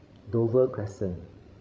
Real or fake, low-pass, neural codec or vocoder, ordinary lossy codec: fake; none; codec, 16 kHz, 16 kbps, FreqCodec, larger model; none